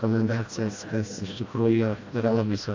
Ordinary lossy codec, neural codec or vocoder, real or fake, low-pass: AAC, 48 kbps; codec, 16 kHz, 1 kbps, FreqCodec, smaller model; fake; 7.2 kHz